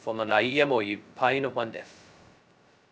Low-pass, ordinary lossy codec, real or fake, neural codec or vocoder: none; none; fake; codec, 16 kHz, 0.2 kbps, FocalCodec